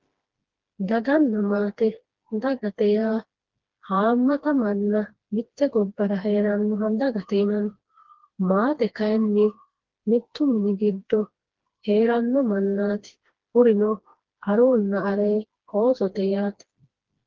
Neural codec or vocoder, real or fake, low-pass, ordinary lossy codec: codec, 16 kHz, 2 kbps, FreqCodec, smaller model; fake; 7.2 kHz; Opus, 16 kbps